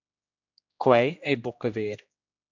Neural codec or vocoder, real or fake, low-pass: codec, 16 kHz, 1 kbps, X-Codec, HuBERT features, trained on general audio; fake; 7.2 kHz